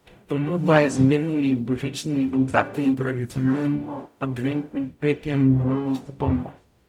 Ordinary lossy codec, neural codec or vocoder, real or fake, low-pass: none; codec, 44.1 kHz, 0.9 kbps, DAC; fake; 19.8 kHz